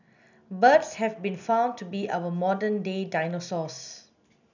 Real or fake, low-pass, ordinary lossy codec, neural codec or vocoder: real; 7.2 kHz; none; none